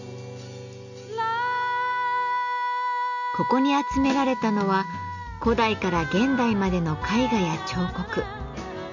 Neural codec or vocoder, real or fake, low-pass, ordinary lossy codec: none; real; 7.2 kHz; none